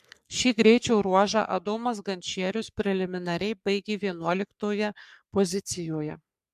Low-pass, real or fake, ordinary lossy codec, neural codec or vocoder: 14.4 kHz; fake; MP3, 96 kbps; codec, 44.1 kHz, 3.4 kbps, Pupu-Codec